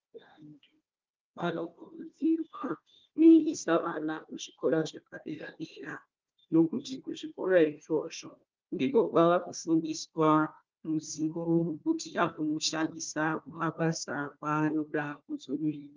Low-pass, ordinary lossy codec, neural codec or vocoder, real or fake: 7.2 kHz; Opus, 24 kbps; codec, 16 kHz, 1 kbps, FunCodec, trained on Chinese and English, 50 frames a second; fake